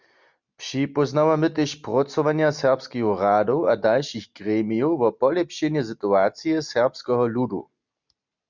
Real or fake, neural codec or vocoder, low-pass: real; none; 7.2 kHz